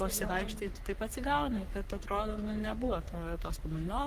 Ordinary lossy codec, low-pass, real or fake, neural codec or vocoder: Opus, 24 kbps; 14.4 kHz; fake; codec, 44.1 kHz, 3.4 kbps, Pupu-Codec